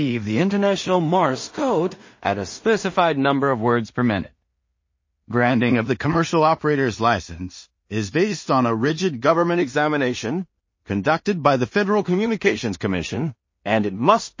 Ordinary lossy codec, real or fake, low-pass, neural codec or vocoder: MP3, 32 kbps; fake; 7.2 kHz; codec, 16 kHz in and 24 kHz out, 0.4 kbps, LongCat-Audio-Codec, two codebook decoder